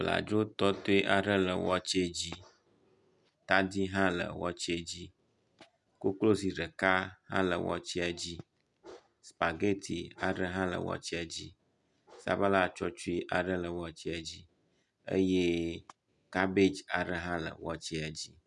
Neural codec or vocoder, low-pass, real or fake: none; 10.8 kHz; real